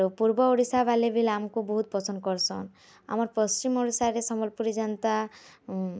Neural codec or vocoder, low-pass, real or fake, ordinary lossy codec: none; none; real; none